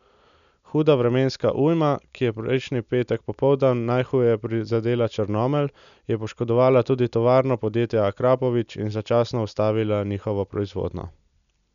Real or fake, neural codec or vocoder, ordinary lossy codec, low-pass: real; none; none; 7.2 kHz